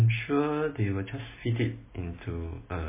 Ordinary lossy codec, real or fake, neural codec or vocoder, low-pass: MP3, 16 kbps; real; none; 3.6 kHz